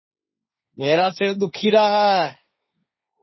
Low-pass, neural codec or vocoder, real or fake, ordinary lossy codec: 7.2 kHz; codec, 16 kHz, 1.1 kbps, Voila-Tokenizer; fake; MP3, 24 kbps